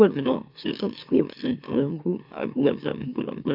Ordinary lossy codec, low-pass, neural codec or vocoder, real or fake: AAC, 48 kbps; 5.4 kHz; autoencoder, 44.1 kHz, a latent of 192 numbers a frame, MeloTTS; fake